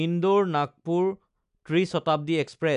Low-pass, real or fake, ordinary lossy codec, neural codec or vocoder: 10.8 kHz; real; none; none